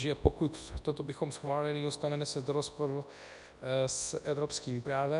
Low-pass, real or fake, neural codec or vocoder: 10.8 kHz; fake; codec, 24 kHz, 0.9 kbps, WavTokenizer, large speech release